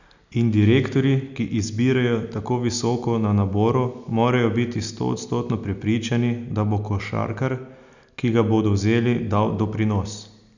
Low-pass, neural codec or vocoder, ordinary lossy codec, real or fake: 7.2 kHz; none; none; real